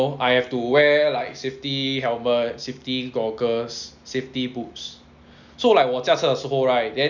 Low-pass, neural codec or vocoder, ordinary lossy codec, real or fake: 7.2 kHz; none; none; real